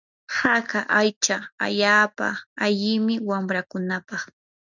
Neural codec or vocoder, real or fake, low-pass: none; real; 7.2 kHz